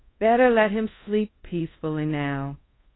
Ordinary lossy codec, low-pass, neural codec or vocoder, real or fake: AAC, 16 kbps; 7.2 kHz; codec, 24 kHz, 0.5 kbps, DualCodec; fake